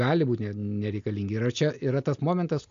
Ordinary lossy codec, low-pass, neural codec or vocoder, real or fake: AAC, 64 kbps; 7.2 kHz; none; real